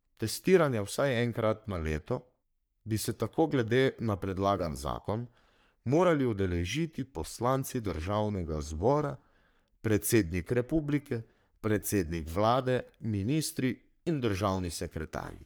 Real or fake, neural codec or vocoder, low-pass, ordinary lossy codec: fake; codec, 44.1 kHz, 3.4 kbps, Pupu-Codec; none; none